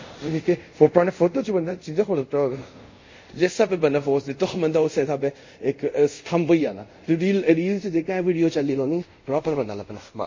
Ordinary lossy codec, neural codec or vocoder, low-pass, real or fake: MP3, 32 kbps; codec, 24 kHz, 0.5 kbps, DualCodec; 7.2 kHz; fake